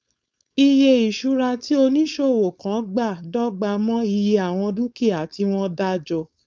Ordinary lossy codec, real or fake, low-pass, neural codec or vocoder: none; fake; none; codec, 16 kHz, 4.8 kbps, FACodec